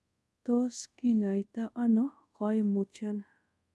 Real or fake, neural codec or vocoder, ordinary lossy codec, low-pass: fake; codec, 24 kHz, 0.5 kbps, DualCodec; none; none